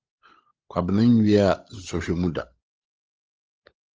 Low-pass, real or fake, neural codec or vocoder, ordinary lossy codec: 7.2 kHz; fake; codec, 16 kHz, 16 kbps, FunCodec, trained on LibriTTS, 50 frames a second; Opus, 24 kbps